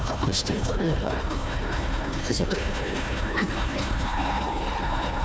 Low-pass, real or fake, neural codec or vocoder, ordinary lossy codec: none; fake; codec, 16 kHz, 1 kbps, FunCodec, trained on Chinese and English, 50 frames a second; none